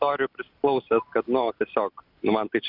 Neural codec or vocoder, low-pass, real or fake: none; 5.4 kHz; real